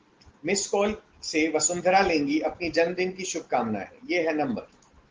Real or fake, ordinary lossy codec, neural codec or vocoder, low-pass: real; Opus, 16 kbps; none; 7.2 kHz